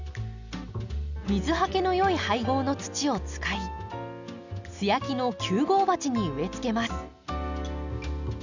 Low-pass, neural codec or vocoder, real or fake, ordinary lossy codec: 7.2 kHz; none; real; none